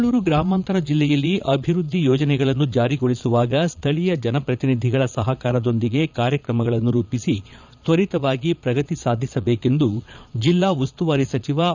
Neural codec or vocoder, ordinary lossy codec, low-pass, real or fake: vocoder, 22.05 kHz, 80 mel bands, Vocos; none; 7.2 kHz; fake